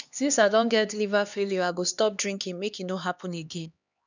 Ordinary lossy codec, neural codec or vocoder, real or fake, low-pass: none; codec, 16 kHz, 2 kbps, X-Codec, HuBERT features, trained on LibriSpeech; fake; 7.2 kHz